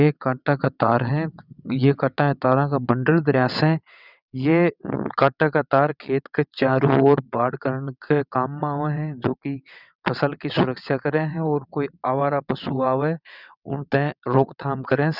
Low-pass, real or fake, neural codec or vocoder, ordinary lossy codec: 5.4 kHz; fake; vocoder, 22.05 kHz, 80 mel bands, WaveNeXt; none